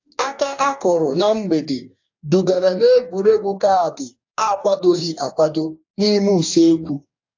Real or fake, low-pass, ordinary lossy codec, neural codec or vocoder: fake; 7.2 kHz; AAC, 48 kbps; codec, 44.1 kHz, 2.6 kbps, DAC